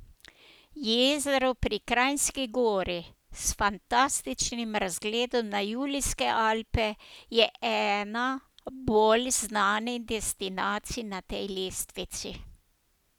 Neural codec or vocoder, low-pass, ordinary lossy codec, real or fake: none; none; none; real